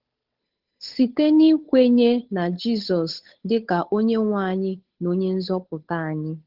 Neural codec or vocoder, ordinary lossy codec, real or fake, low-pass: codec, 16 kHz, 8 kbps, FunCodec, trained on Chinese and English, 25 frames a second; Opus, 16 kbps; fake; 5.4 kHz